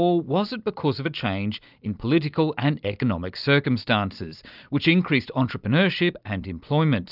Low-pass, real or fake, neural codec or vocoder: 5.4 kHz; real; none